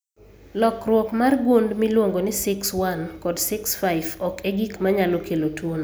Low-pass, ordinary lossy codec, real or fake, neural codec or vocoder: none; none; real; none